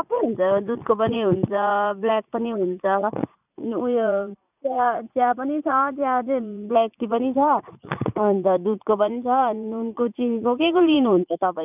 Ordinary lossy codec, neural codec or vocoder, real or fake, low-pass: none; vocoder, 44.1 kHz, 80 mel bands, Vocos; fake; 3.6 kHz